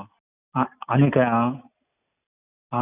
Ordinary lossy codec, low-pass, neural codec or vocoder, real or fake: none; 3.6 kHz; none; real